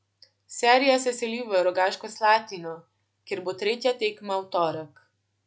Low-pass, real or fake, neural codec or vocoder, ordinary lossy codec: none; real; none; none